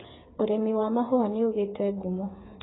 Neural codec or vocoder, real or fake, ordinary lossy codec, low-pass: codec, 16 kHz, 8 kbps, FreqCodec, smaller model; fake; AAC, 16 kbps; 7.2 kHz